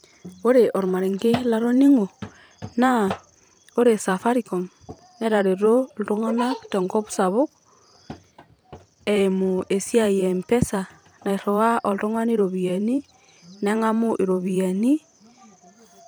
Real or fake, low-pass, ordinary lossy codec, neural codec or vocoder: fake; none; none; vocoder, 44.1 kHz, 128 mel bands every 512 samples, BigVGAN v2